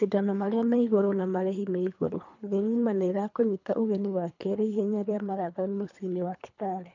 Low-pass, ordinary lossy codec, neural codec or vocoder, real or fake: 7.2 kHz; none; codec, 24 kHz, 3 kbps, HILCodec; fake